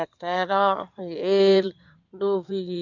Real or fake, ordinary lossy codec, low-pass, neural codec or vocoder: fake; MP3, 64 kbps; 7.2 kHz; codec, 16 kHz in and 24 kHz out, 2.2 kbps, FireRedTTS-2 codec